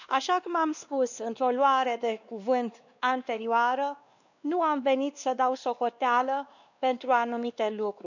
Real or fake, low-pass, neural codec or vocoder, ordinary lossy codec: fake; 7.2 kHz; codec, 16 kHz, 2 kbps, X-Codec, WavLM features, trained on Multilingual LibriSpeech; none